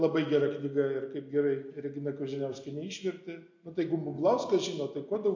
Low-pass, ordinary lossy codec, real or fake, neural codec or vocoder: 7.2 kHz; MP3, 48 kbps; real; none